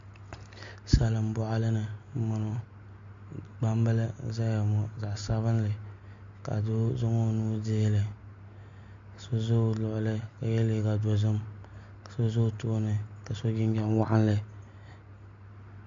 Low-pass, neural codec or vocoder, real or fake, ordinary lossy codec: 7.2 kHz; none; real; MP3, 48 kbps